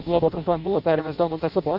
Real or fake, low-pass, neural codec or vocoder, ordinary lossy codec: fake; 5.4 kHz; codec, 16 kHz in and 24 kHz out, 0.6 kbps, FireRedTTS-2 codec; MP3, 48 kbps